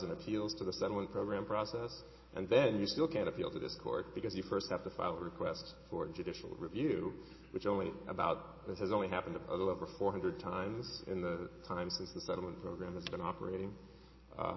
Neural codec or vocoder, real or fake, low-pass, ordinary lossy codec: none; real; 7.2 kHz; MP3, 24 kbps